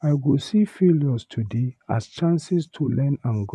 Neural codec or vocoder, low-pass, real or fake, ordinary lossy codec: vocoder, 24 kHz, 100 mel bands, Vocos; none; fake; none